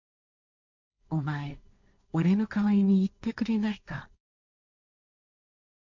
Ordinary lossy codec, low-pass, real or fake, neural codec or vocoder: none; 7.2 kHz; fake; codec, 16 kHz, 1.1 kbps, Voila-Tokenizer